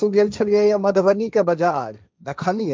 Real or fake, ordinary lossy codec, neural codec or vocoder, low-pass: fake; none; codec, 16 kHz, 1.1 kbps, Voila-Tokenizer; none